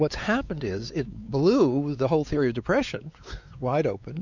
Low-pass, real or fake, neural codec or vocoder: 7.2 kHz; fake; codec, 16 kHz, 4 kbps, X-Codec, WavLM features, trained on Multilingual LibriSpeech